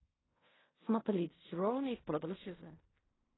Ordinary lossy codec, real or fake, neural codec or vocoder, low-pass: AAC, 16 kbps; fake; codec, 16 kHz in and 24 kHz out, 0.4 kbps, LongCat-Audio-Codec, fine tuned four codebook decoder; 7.2 kHz